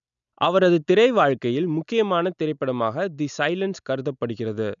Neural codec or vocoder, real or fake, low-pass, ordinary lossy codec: none; real; 7.2 kHz; none